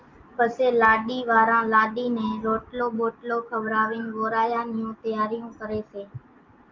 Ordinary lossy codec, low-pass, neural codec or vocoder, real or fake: Opus, 24 kbps; 7.2 kHz; none; real